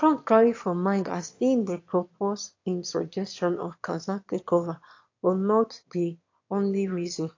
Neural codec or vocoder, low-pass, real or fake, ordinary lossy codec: autoencoder, 22.05 kHz, a latent of 192 numbers a frame, VITS, trained on one speaker; 7.2 kHz; fake; AAC, 48 kbps